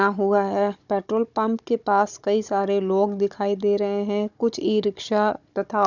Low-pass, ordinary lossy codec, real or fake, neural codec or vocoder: 7.2 kHz; none; fake; codec, 16 kHz, 16 kbps, FunCodec, trained on Chinese and English, 50 frames a second